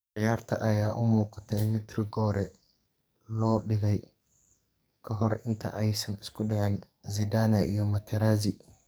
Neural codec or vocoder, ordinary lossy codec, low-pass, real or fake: codec, 44.1 kHz, 2.6 kbps, SNAC; none; none; fake